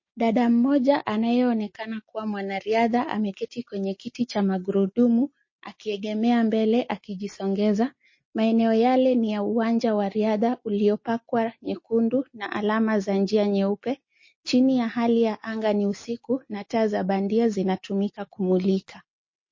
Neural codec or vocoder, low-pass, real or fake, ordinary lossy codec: none; 7.2 kHz; real; MP3, 32 kbps